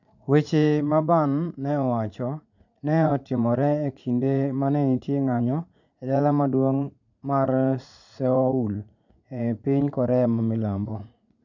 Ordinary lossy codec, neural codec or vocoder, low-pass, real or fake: none; vocoder, 44.1 kHz, 80 mel bands, Vocos; 7.2 kHz; fake